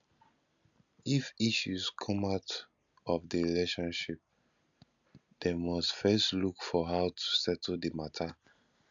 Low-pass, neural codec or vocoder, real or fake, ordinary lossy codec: 7.2 kHz; none; real; none